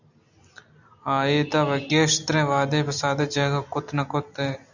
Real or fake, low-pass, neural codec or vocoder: real; 7.2 kHz; none